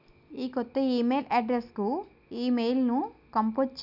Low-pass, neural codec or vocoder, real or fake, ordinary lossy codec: 5.4 kHz; none; real; none